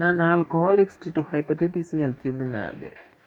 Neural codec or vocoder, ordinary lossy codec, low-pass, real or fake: codec, 44.1 kHz, 2.6 kbps, DAC; none; 19.8 kHz; fake